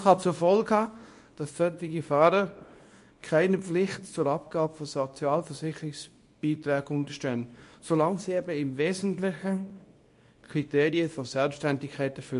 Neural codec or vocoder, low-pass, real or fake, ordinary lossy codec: codec, 24 kHz, 0.9 kbps, WavTokenizer, small release; 10.8 kHz; fake; MP3, 48 kbps